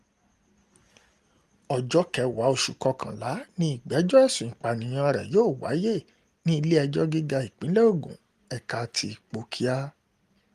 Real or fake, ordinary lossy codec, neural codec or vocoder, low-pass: real; Opus, 24 kbps; none; 14.4 kHz